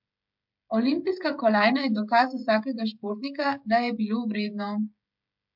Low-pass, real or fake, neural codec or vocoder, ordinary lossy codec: 5.4 kHz; fake; codec, 16 kHz, 16 kbps, FreqCodec, smaller model; MP3, 48 kbps